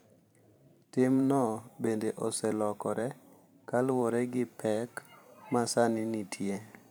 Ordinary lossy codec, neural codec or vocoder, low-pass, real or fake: none; vocoder, 44.1 kHz, 128 mel bands every 512 samples, BigVGAN v2; none; fake